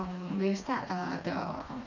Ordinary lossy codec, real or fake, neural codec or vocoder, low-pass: none; fake; codec, 16 kHz, 2 kbps, FreqCodec, smaller model; 7.2 kHz